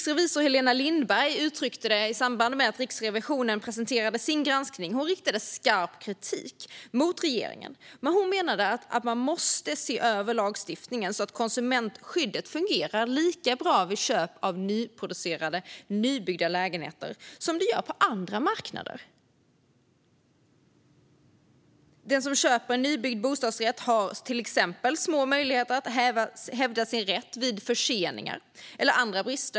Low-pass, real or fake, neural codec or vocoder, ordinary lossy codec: none; real; none; none